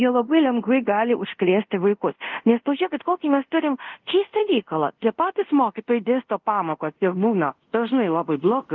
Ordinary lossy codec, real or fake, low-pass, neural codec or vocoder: Opus, 24 kbps; fake; 7.2 kHz; codec, 24 kHz, 0.5 kbps, DualCodec